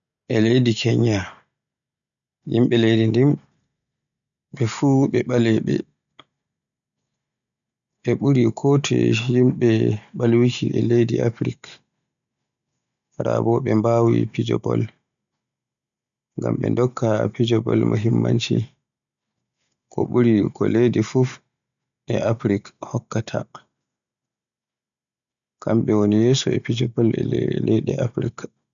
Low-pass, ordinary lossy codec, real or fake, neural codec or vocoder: 7.2 kHz; none; real; none